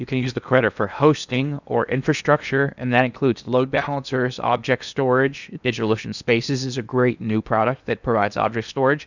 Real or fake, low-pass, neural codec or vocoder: fake; 7.2 kHz; codec, 16 kHz in and 24 kHz out, 0.8 kbps, FocalCodec, streaming, 65536 codes